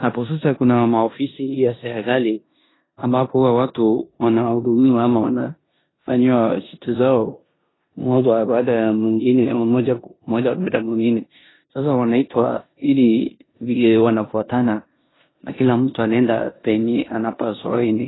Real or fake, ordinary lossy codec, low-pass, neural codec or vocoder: fake; AAC, 16 kbps; 7.2 kHz; codec, 16 kHz in and 24 kHz out, 0.9 kbps, LongCat-Audio-Codec, four codebook decoder